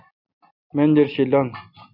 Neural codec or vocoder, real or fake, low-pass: none; real; 5.4 kHz